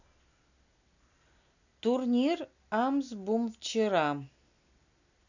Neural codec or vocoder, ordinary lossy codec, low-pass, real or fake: none; none; 7.2 kHz; real